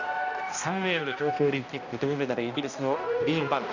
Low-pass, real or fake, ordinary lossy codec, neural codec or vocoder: 7.2 kHz; fake; none; codec, 16 kHz, 1 kbps, X-Codec, HuBERT features, trained on balanced general audio